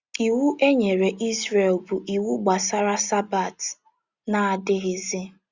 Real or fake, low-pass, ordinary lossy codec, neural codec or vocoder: real; 7.2 kHz; Opus, 64 kbps; none